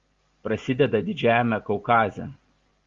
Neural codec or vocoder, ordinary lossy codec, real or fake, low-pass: none; Opus, 32 kbps; real; 7.2 kHz